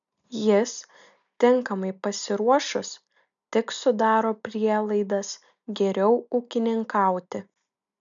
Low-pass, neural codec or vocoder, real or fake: 7.2 kHz; none; real